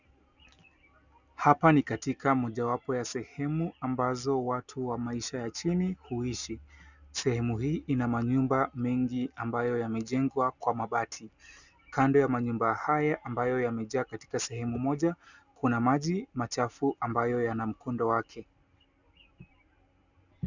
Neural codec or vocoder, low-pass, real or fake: none; 7.2 kHz; real